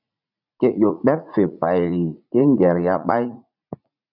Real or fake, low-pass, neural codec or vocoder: fake; 5.4 kHz; vocoder, 44.1 kHz, 80 mel bands, Vocos